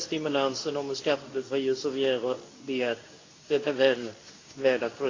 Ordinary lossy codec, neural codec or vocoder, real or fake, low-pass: AAC, 32 kbps; codec, 24 kHz, 0.9 kbps, WavTokenizer, medium speech release version 2; fake; 7.2 kHz